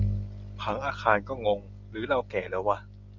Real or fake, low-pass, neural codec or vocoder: real; 7.2 kHz; none